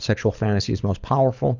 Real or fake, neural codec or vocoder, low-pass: fake; codec, 24 kHz, 6 kbps, HILCodec; 7.2 kHz